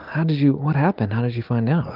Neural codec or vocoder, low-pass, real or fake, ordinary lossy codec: none; 5.4 kHz; real; Opus, 32 kbps